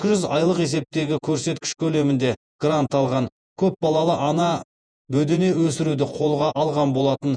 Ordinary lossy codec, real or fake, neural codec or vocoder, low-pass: none; fake; vocoder, 48 kHz, 128 mel bands, Vocos; 9.9 kHz